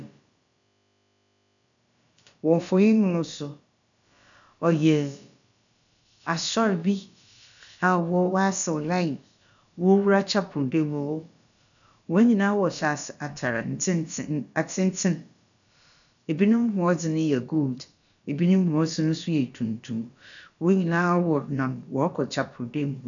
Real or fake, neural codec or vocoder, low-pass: fake; codec, 16 kHz, about 1 kbps, DyCAST, with the encoder's durations; 7.2 kHz